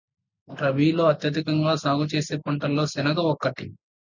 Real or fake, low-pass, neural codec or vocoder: real; 7.2 kHz; none